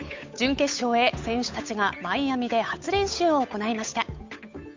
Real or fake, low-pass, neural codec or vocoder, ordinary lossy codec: fake; 7.2 kHz; codec, 16 kHz, 8 kbps, FunCodec, trained on Chinese and English, 25 frames a second; MP3, 64 kbps